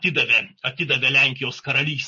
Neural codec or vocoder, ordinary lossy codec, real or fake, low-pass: none; MP3, 32 kbps; real; 7.2 kHz